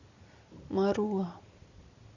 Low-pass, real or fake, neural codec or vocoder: 7.2 kHz; real; none